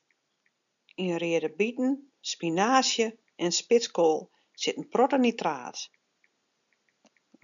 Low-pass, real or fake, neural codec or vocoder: 7.2 kHz; real; none